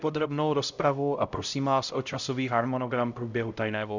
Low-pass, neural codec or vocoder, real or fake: 7.2 kHz; codec, 16 kHz, 0.5 kbps, X-Codec, HuBERT features, trained on LibriSpeech; fake